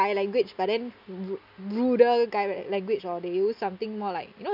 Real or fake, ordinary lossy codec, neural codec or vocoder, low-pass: real; none; none; 5.4 kHz